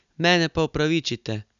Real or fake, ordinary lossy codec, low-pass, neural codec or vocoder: real; none; 7.2 kHz; none